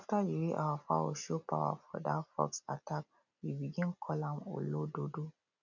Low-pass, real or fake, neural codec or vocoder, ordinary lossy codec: 7.2 kHz; real; none; none